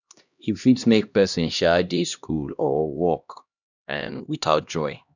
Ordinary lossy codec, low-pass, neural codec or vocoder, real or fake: none; 7.2 kHz; codec, 16 kHz, 1 kbps, X-Codec, HuBERT features, trained on LibriSpeech; fake